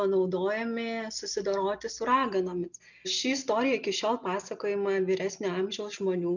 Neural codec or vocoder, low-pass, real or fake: none; 7.2 kHz; real